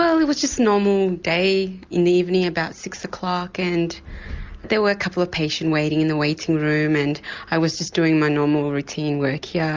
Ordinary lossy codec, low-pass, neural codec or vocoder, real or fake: Opus, 32 kbps; 7.2 kHz; none; real